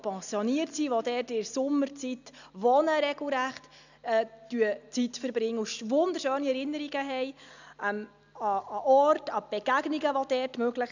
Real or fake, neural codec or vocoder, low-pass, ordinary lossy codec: real; none; 7.2 kHz; none